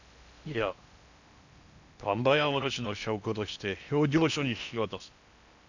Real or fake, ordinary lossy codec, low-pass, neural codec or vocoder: fake; none; 7.2 kHz; codec, 16 kHz in and 24 kHz out, 0.8 kbps, FocalCodec, streaming, 65536 codes